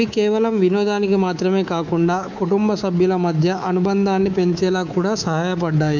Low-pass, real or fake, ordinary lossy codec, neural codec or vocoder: 7.2 kHz; fake; none; codec, 24 kHz, 3.1 kbps, DualCodec